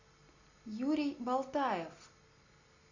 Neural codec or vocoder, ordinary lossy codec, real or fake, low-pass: none; MP3, 64 kbps; real; 7.2 kHz